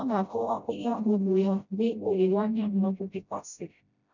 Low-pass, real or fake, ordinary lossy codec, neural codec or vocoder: 7.2 kHz; fake; none; codec, 16 kHz, 0.5 kbps, FreqCodec, smaller model